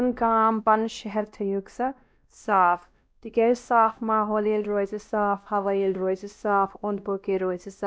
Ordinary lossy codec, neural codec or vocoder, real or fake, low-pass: none; codec, 16 kHz, 1 kbps, X-Codec, WavLM features, trained on Multilingual LibriSpeech; fake; none